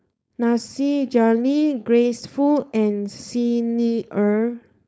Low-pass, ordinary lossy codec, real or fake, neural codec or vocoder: none; none; fake; codec, 16 kHz, 4.8 kbps, FACodec